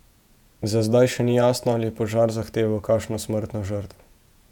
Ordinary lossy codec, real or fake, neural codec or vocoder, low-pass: none; fake; vocoder, 48 kHz, 128 mel bands, Vocos; 19.8 kHz